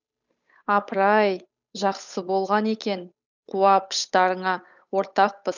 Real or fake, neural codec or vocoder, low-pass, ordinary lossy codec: fake; codec, 16 kHz, 8 kbps, FunCodec, trained on Chinese and English, 25 frames a second; 7.2 kHz; none